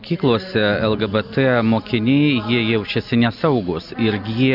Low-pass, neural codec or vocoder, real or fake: 5.4 kHz; none; real